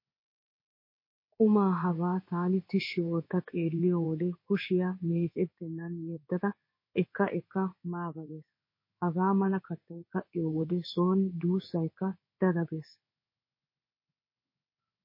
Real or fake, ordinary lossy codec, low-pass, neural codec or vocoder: fake; MP3, 24 kbps; 5.4 kHz; codec, 16 kHz in and 24 kHz out, 1 kbps, XY-Tokenizer